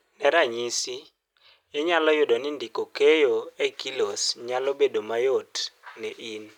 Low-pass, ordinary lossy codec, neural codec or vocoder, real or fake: 19.8 kHz; none; none; real